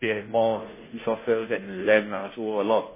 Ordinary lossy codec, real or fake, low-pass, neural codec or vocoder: MP3, 16 kbps; fake; 3.6 kHz; codec, 16 kHz, 0.5 kbps, FunCodec, trained on Chinese and English, 25 frames a second